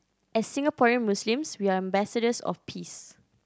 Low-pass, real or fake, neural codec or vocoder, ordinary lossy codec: none; real; none; none